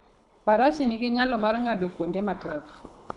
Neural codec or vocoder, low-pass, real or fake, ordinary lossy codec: codec, 24 kHz, 3 kbps, HILCodec; 10.8 kHz; fake; none